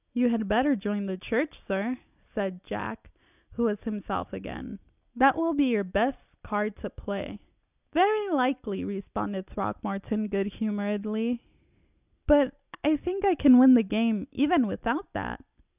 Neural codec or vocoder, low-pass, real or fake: none; 3.6 kHz; real